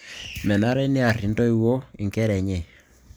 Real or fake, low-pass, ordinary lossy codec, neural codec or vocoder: real; none; none; none